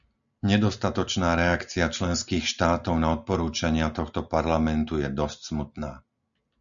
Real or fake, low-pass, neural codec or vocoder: real; 7.2 kHz; none